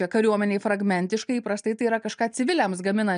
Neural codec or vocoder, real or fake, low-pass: none; real; 9.9 kHz